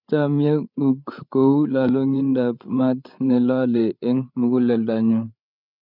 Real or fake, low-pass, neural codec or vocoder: fake; 5.4 kHz; codec, 16 kHz, 8 kbps, FreqCodec, larger model